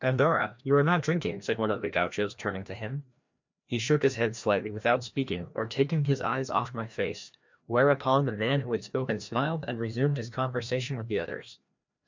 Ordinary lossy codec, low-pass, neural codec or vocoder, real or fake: MP3, 64 kbps; 7.2 kHz; codec, 16 kHz, 1 kbps, FreqCodec, larger model; fake